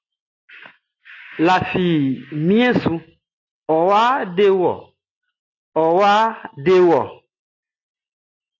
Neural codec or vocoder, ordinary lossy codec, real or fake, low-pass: none; AAC, 32 kbps; real; 7.2 kHz